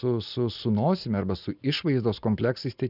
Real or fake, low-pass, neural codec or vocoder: real; 5.4 kHz; none